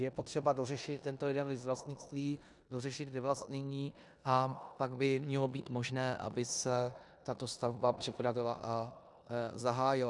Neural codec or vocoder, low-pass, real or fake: codec, 16 kHz in and 24 kHz out, 0.9 kbps, LongCat-Audio-Codec, four codebook decoder; 10.8 kHz; fake